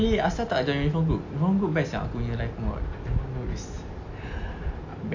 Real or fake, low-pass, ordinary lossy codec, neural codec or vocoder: real; 7.2 kHz; AAC, 48 kbps; none